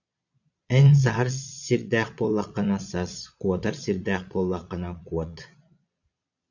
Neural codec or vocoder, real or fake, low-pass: vocoder, 22.05 kHz, 80 mel bands, Vocos; fake; 7.2 kHz